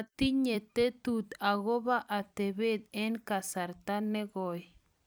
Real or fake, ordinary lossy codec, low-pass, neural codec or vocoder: fake; none; none; vocoder, 44.1 kHz, 128 mel bands every 256 samples, BigVGAN v2